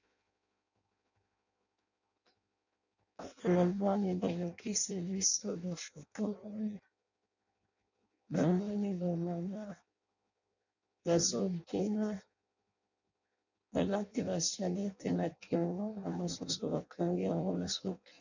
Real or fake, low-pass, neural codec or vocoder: fake; 7.2 kHz; codec, 16 kHz in and 24 kHz out, 0.6 kbps, FireRedTTS-2 codec